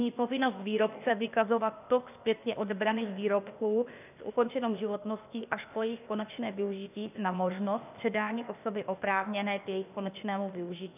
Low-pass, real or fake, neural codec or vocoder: 3.6 kHz; fake; codec, 16 kHz, 0.8 kbps, ZipCodec